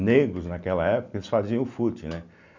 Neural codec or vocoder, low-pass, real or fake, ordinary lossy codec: none; 7.2 kHz; real; none